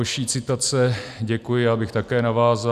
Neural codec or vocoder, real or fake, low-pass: none; real; 14.4 kHz